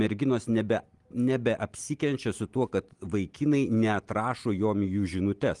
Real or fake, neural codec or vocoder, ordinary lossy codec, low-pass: real; none; Opus, 24 kbps; 10.8 kHz